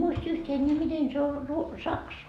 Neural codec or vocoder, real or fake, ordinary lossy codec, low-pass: none; real; AAC, 96 kbps; 14.4 kHz